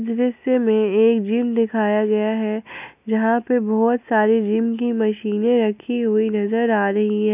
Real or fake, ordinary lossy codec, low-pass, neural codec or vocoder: real; none; 3.6 kHz; none